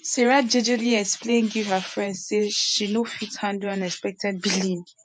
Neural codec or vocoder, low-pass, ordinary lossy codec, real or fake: vocoder, 48 kHz, 128 mel bands, Vocos; 9.9 kHz; MP3, 64 kbps; fake